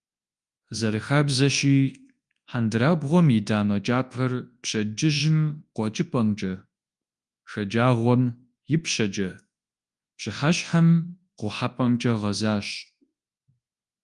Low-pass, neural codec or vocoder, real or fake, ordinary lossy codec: 10.8 kHz; codec, 24 kHz, 0.9 kbps, WavTokenizer, large speech release; fake; Opus, 32 kbps